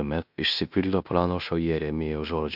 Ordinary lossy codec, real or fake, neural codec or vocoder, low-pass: AAC, 48 kbps; fake; codec, 16 kHz in and 24 kHz out, 0.9 kbps, LongCat-Audio-Codec, fine tuned four codebook decoder; 5.4 kHz